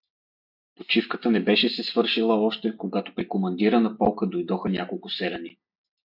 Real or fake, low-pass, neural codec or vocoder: real; 5.4 kHz; none